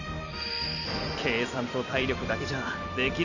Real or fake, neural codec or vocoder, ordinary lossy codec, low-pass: fake; vocoder, 44.1 kHz, 128 mel bands every 256 samples, BigVGAN v2; none; 7.2 kHz